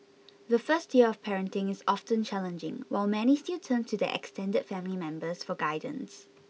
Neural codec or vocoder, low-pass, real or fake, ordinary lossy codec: none; none; real; none